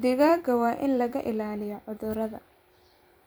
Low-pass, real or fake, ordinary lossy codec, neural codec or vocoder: none; fake; none; vocoder, 44.1 kHz, 128 mel bands every 256 samples, BigVGAN v2